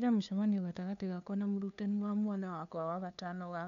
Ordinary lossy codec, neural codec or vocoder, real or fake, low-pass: none; codec, 16 kHz, 2 kbps, FunCodec, trained on LibriTTS, 25 frames a second; fake; 7.2 kHz